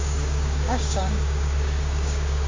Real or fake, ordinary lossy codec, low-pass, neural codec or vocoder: fake; AAC, 32 kbps; 7.2 kHz; codec, 16 kHz, 6 kbps, DAC